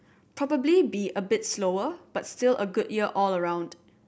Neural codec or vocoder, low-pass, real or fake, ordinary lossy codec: none; none; real; none